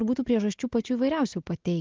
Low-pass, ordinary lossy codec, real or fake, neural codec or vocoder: 7.2 kHz; Opus, 16 kbps; real; none